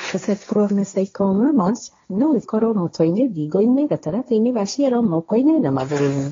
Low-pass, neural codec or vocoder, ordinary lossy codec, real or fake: 7.2 kHz; codec, 16 kHz, 1.1 kbps, Voila-Tokenizer; AAC, 32 kbps; fake